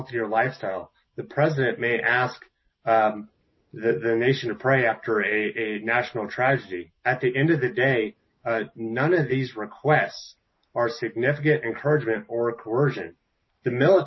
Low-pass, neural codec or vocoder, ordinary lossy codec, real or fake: 7.2 kHz; none; MP3, 24 kbps; real